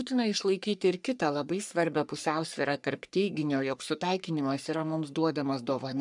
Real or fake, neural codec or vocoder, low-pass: fake; codec, 44.1 kHz, 3.4 kbps, Pupu-Codec; 10.8 kHz